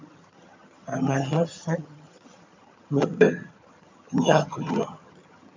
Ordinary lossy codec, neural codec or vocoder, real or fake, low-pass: MP3, 48 kbps; vocoder, 22.05 kHz, 80 mel bands, HiFi-GAN; fake; 7.2 kHz